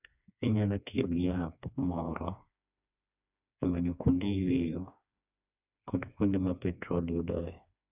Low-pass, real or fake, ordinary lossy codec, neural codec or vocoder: 3.6 kHz; fake; none; codec, 16 kHz, 2 kbps, FreqCodec, smaller model